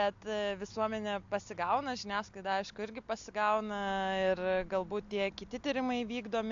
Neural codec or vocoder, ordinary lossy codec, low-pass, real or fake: none; AAC, 64 kbps; 7.2 kHz; real